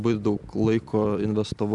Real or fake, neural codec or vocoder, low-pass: real; none; 10.8 kHz